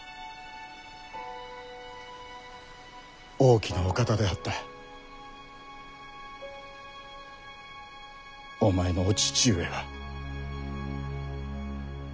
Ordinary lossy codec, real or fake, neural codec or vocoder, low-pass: none; real; none; none